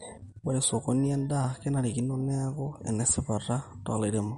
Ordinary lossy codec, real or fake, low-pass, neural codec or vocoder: MP3, 48 kbps; real; 19.8 kHz; none